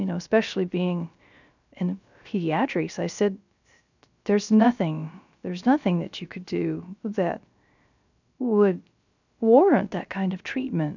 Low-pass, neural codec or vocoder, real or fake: 7.2 kHz; codec, 16 kHz, 0.3 kbps, FocalCodec; fake